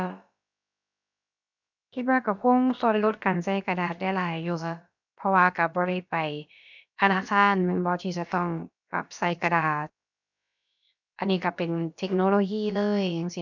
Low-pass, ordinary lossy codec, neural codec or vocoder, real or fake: 7.2 kHz; none; codec, 16 kHz, about 1 kbps, DyCAST, with the encoder's durations; fake